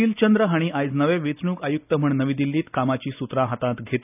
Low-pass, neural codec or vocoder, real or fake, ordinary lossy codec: 3.6 kHz; none; real; none